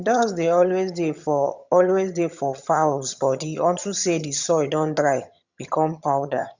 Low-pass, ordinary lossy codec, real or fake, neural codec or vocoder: 7.2 kHz; Opus, 64 kbps; fake; vocoder, 22.05 kHz, 80 mel bands, HiFi-GAN